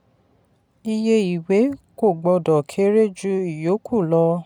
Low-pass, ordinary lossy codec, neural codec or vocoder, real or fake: 19.8 kHz; none; none; real